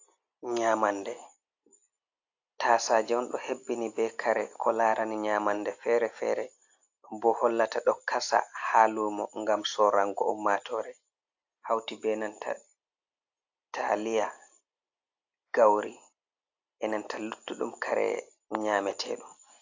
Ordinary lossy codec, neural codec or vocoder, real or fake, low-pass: AAC, 48 kbps; none; real; 7.2 kHz